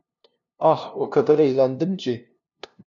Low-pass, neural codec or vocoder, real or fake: 7.2 kHz; codec, 16 kHz, 0.5 kbps, FunCodec, trained on LibriTTS, 25 frames a second; fake